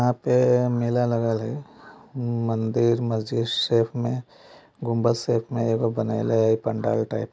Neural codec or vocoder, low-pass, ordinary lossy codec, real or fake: none; none; none; real